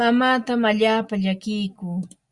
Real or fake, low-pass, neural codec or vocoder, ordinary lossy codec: real; 10.8 kHz; none; Opus, 64 kbps